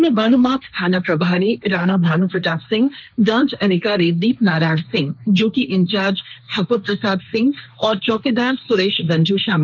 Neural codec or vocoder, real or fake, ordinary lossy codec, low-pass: codec, 16 kHz, 1.1 kbps, Voila-Tokenizer; fake; none; 7.2 kHz